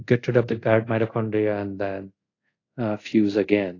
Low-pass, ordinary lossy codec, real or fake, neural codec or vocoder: 7.2 kHz; AAC, 32 kbps; fake; codec, 24 kHz, 0.5 kbps, DualCodec